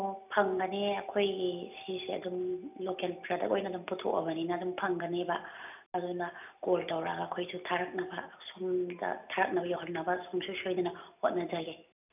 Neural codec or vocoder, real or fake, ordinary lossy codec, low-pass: none; real; none; 3.6 kHz